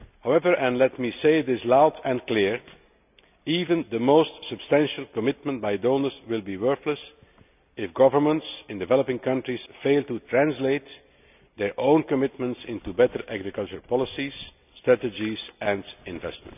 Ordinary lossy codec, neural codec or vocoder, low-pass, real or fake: none; none; 3.6 kHz; real